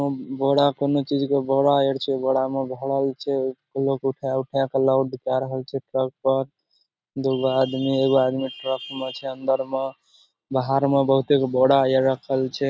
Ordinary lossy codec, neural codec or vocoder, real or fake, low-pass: none; none; real; none